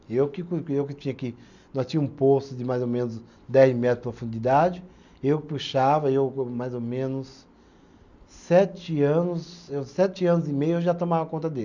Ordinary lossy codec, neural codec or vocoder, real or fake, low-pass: none; none; real; 7.2 kHz